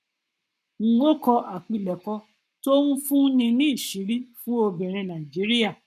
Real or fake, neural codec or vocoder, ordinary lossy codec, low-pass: fake; codec, 44.1 kHz, 7.8 kbps, Pupu-Codec; none; 14.4 kHz